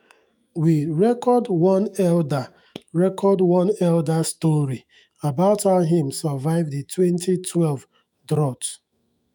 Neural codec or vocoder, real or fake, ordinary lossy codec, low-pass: autoencoder, 48 kHz, 128 numbers a frame, DAC-VAE, trained on Japanese speech; fake; none; none